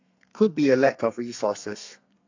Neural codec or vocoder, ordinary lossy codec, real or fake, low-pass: codec, 32 kHz, 1.9 kbps, SNAC; AAC, 48 kbps; fake; 7.2 kHz